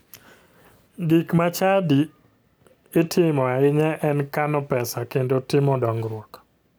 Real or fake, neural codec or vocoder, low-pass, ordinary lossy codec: real; none; none; none